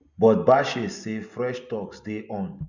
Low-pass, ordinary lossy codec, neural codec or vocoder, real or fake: 7.2 kHz; none; none; real